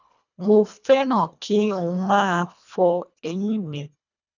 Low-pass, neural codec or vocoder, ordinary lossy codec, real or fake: 7.2 kHz; codec, 24 kHz, 1.5 kbps, HILCodec; none; fake